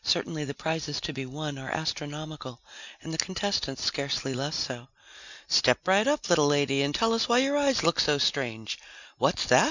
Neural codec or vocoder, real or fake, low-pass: none; real; 7.2 kHz